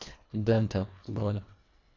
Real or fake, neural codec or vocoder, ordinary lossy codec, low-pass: fake; codec, 24 kHz, 1.5 kbps, HILCodec; AAC, 48 kbps; 7.2 kHz